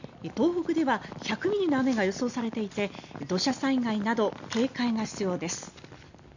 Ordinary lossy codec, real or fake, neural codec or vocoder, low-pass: none; real; none; 7.2 kHz